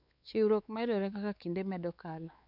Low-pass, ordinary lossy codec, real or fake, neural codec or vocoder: 5.4 kHz; none; fake; codec, 16 kHz, 4 kbps, X-Codec, WavLM features, trained on Multilingual LibriSpeech